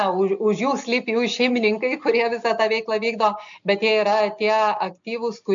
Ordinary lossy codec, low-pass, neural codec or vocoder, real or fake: MP3, 64 kbps; 7.2 kHz; none; real